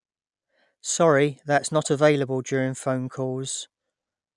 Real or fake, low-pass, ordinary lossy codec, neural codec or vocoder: real; 10.8 kHz; none; none